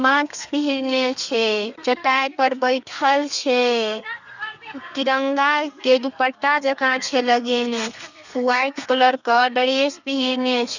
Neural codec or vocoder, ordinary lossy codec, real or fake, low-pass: codec, 32 kHz, 1.9 kbps, SNAC; none; fake; 7.2 kHz